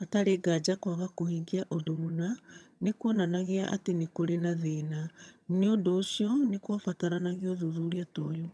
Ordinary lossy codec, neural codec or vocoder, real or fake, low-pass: none; vocoder, 22.05 kHz, 80 mel bands, HiFi-GAN; fake; none